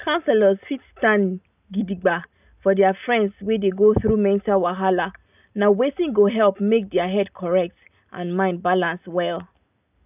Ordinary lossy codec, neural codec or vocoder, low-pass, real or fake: none; none; 3.6 kHz; real